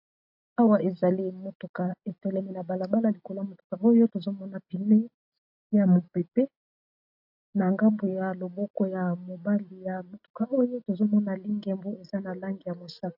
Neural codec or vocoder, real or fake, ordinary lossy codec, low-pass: none; real; AAC, 48 kbps; 5.4 kHz